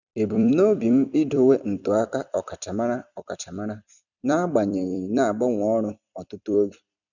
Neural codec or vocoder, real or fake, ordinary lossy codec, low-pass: vocoder, 44.1 kHz, 128 mel bands every 256 samples, BigVGAN v2; fake; none; 7.2 kHz